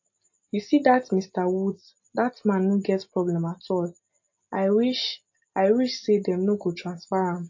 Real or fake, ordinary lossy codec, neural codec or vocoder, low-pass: real; MP3, 32 kbps; none; 7.2 kHz